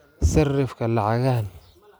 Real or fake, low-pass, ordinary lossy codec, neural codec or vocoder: real; none; none; none